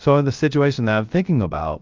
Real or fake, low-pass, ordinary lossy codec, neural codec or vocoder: fake; 7.2 kHz; Opus, 24 kbps; codec, 16 kHz, 0.3 kbps, FocalCodec